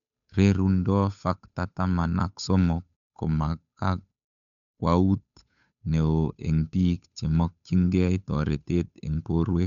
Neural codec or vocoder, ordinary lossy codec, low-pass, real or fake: codec, 16 kHz, 8 kbps, FunCodec, trained on Chinese and English, 25 frames a second; none; 7.2 kHz; fake